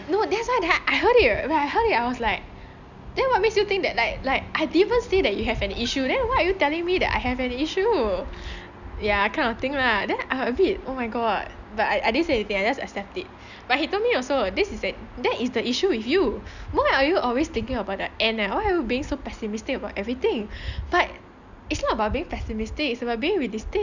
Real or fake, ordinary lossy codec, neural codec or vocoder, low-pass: real; none; none; 7.2 kHz